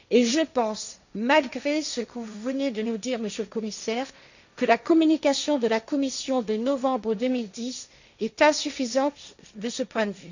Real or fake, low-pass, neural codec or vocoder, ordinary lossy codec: fake; 7.2 kHz; codec, 16 kHz, 1.1 kbps, Voila-Tokenizer; none